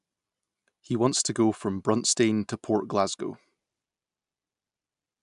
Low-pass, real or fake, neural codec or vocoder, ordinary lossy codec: 10.8 kHz; real; none; MP3, 96 kbps